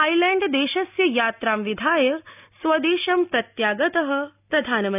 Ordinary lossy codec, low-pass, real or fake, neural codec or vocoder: none; 3.6 kHz; real; none